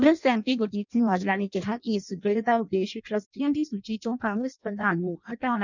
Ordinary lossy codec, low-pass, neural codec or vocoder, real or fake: none; 7.2 kHz; codec, 16 kHz in and 24 kHz out, 0.6 kbps, FireRedTTS-2 codec; fake